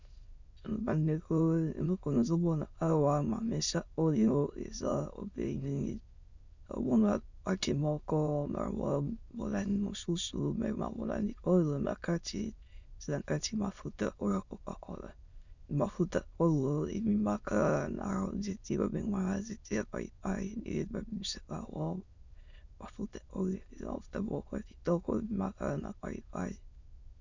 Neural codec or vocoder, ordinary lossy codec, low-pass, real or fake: autoencoder, 22.05 kHz, a latent of 192 numbers a frame, VITS, trained on many speakers; Opus, 64 kbps; 7.2 kHz; fake